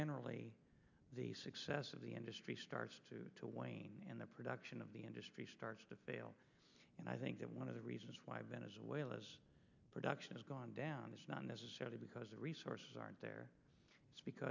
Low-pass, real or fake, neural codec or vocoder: 7.2 kHz; real; none